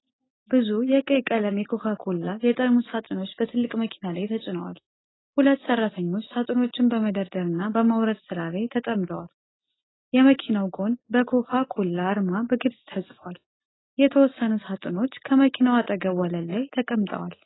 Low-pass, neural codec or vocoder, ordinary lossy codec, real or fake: 7.2 kHz; none; AAC, 16 kbps; real